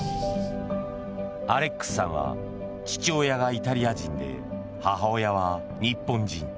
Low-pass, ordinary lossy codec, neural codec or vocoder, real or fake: none; none; none; real